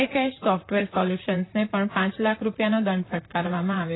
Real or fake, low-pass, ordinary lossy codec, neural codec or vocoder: fake; 7.2 kHz; AAC, 16 kbps; vocoder, 44.1 kHz, 128 mel bands, Pupu-Vocoder